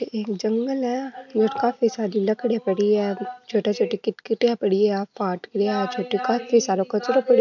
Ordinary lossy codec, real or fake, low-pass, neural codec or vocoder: none; real; 7.2 kHz; none